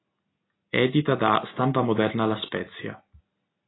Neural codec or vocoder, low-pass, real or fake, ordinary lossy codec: none; 7.2 kHz; real; AAC, 16 kbps